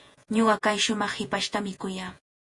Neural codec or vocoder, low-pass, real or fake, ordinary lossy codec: vocoder, 48 kHz, 128 mel bands, Vocos; 10.8 kHz; fake; MP3, 48 kbps